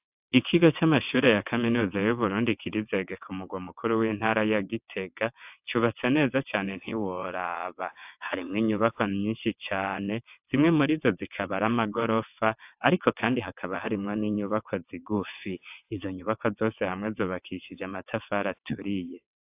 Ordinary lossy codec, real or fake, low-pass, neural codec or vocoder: AAC, 32 kbps; fake; 3.6 kHz; vocoder, 22.05 kHz, 80 mel bands, WaveNeXt